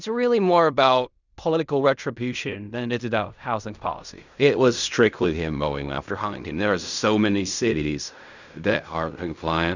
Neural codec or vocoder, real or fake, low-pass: codec, 16 kHz in and 24 kHz out, 0.4 kbps, LongCat-Audio-Codec, fine tuned four codebook decoder; fake; 7.2 kHz